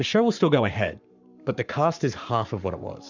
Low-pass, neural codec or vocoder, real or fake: 7.2 kHz; codec, 44.1 kHz, 7.8 kbps, Pupu-Codec; fake